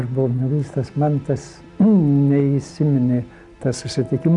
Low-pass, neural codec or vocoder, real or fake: 10.8 kHz; none; real